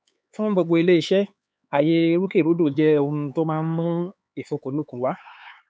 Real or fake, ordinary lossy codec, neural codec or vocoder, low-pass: fake; none; codec, 16 kHz, 4 kbps, X-Codec, HuBERT features, trained on LibriSpeech; none